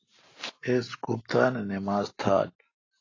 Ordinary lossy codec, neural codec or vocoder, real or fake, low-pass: AAC, 32 kbps; none; real; 7.2 kHz